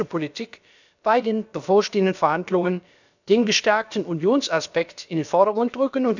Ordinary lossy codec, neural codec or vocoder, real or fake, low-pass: none; codec, 16 kHz, about 1 kbps, DyCAST, with the encoder's durations; fake; 7.2 kHz